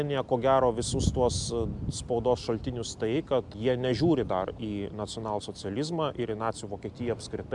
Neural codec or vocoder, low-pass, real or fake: none; 10.8 kHz; real